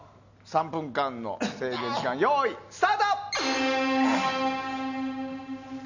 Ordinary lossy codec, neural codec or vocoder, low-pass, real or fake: none; none; 7.2 kHz; real